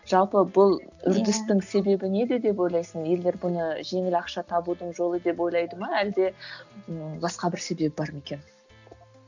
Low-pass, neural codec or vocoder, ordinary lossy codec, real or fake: 7.2 kHz; none; none; real